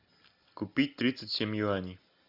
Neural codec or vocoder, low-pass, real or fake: none; 5.4 kHz; real